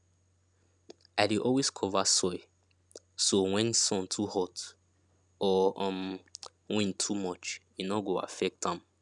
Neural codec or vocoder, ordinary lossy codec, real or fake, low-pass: none; none; real; 10.8 kHz